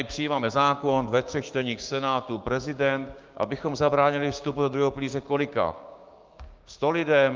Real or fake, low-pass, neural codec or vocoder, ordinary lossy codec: fake; 7.2 kHz; codec, 16 kHz, 6 kbps, DAC; Opus, 24 kbps